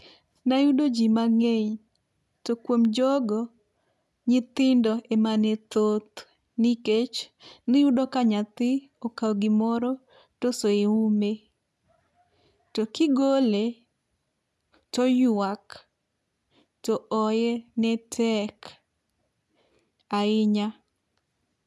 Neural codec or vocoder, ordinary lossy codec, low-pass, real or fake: none; none; none; real